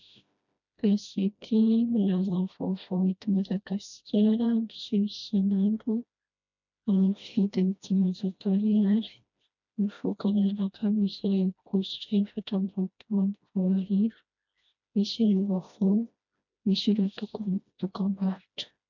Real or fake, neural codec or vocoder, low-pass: fake; codec, 16 kHz, 1 kbps, FreqCodec, smaller model; 7.2 kHz